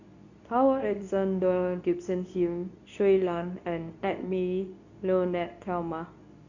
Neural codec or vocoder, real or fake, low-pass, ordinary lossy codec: codec, 24 kHz, 0.9 kbps, WavTokenizer, medium speech release version 2; fake; 7.2 kHz; none